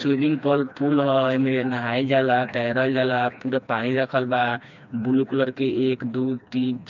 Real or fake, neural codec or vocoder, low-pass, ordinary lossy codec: fake; codec, 16 kHz, 2 kbps, FreqCodec, smaller model; 7.2 kHz; none